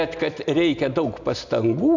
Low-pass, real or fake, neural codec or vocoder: 7.2 kHz; real; none